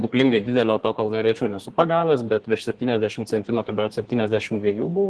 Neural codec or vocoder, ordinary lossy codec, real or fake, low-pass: codec, 32 kHz, 1.9 kbps, SNAC; Opus, 16 kbps; fake; 10.8 kHz